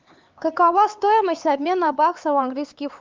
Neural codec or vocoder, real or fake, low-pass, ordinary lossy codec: codec, 16 kHz, 4 kbps, X-Codec, HuBERT features, trained on LibriSpeech; fake; 7.2 kHz; Opus, 24 kbps